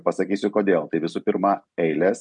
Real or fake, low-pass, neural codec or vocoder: real; 10.8 kHz; none